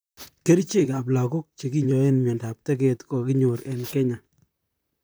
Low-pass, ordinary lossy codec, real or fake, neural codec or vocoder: none; none; fake; vocoder, 44.1 kHz, 128 mel bands, Pupu-Vocoder